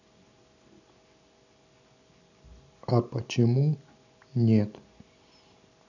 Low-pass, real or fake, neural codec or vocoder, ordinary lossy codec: 7.2 kHz; real; none; none